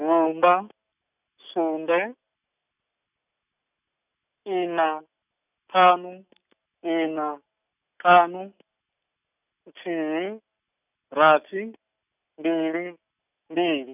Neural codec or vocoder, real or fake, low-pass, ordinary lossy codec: none; real; 3.6 kHz; none